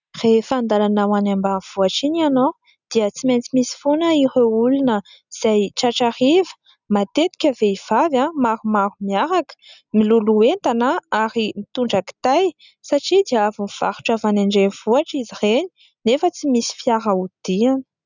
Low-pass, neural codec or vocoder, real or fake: 7.2 kHz; none; real